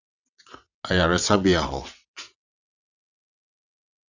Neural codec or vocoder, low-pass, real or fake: vocoder, 22.05 kHz, 80 mel bands, WaveNeXt; 7.2 kHz; fake